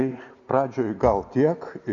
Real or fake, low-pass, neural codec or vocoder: real; 7.2 kHz; none